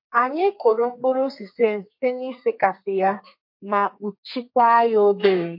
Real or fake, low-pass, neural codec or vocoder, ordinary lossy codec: fake; 5.4 kHz; codec, 32 kHz, 1.9 kbps, SNAC; MP3, 32 kbps